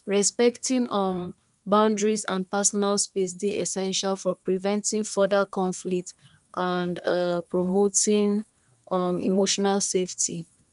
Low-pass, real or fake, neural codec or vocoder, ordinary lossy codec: 10.8 kHz; fake; codec, 24 kHz, 1 kbps, SNAC; none